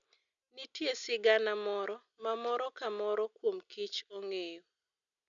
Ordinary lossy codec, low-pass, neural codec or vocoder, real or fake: none; 7.2 kHz; none; real